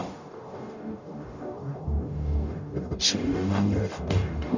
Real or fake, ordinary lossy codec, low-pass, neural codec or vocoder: fake; none; 7.2 kHz; codec, 44.1 kHz, 0.9 kbps, DAC